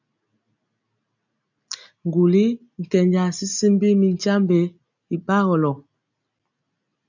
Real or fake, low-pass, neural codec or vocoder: real; 7.2 kHz; none